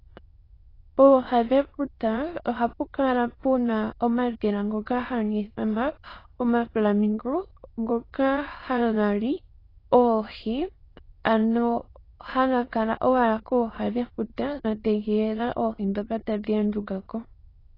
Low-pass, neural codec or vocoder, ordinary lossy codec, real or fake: 5.4 kHz; autoencoder, 22.05 kHz, a latent of 192 numbers a frame, VITS, trained on many speakers; AAC, 24 kbps; fake